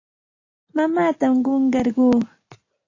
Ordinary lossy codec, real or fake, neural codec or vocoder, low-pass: AAC, 32 kbps; real; none; 7.2 kHz